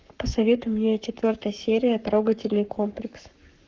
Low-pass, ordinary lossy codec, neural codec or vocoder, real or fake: 7.2 kHz; Opus, 32 kbps; codec, 44.1 kHz, 7.8 kbps, Pupu-Codec; fake